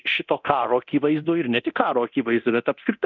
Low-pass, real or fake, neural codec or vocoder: 7.2 kHz; fake; codec, 24 kHz, 0.9 kbps, DualCodec